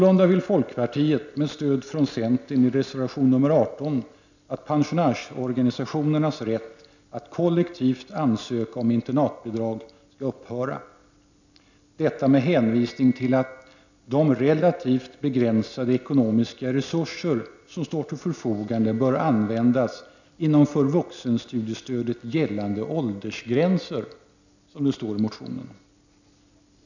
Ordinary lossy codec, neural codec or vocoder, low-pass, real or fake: none; none; 7.2 kHz; real